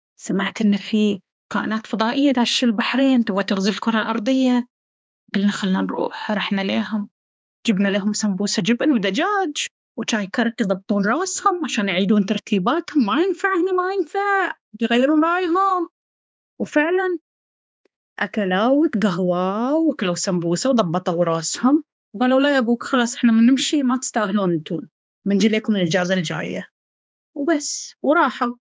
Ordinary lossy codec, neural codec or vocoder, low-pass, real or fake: none; codec, 16 kHz, 2 kbps, X-Codec, HuBERT features, trained on balanced general audio; none; fake